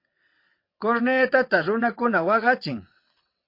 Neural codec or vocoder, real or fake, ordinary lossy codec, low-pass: vocoder, 22.05 kHz, 80 mel bands, WaveNeXt; fake; MP3, 32 kbps; 5.4 kHz